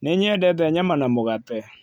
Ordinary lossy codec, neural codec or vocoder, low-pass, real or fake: none; none; 19.8 kHz; real